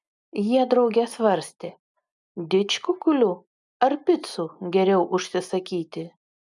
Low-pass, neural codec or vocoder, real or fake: 10.8 kHz; none; real